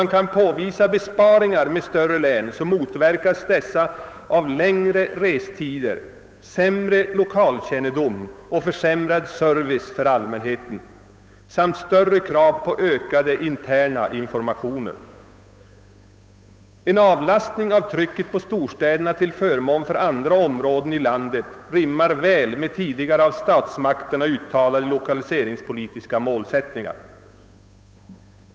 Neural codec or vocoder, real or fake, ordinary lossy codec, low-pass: codec, 16 kHz, 8 kbps, FunCodec, trained on Chinese and English, 25 frames a second; fake; none; none